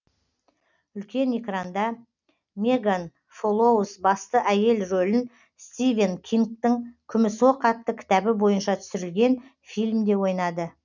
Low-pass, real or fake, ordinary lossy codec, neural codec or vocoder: 7.2 kHz; real; none; none